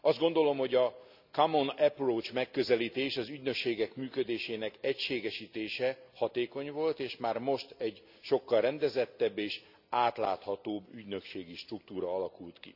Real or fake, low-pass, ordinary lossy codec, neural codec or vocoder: real; 5.4 kHz; none; none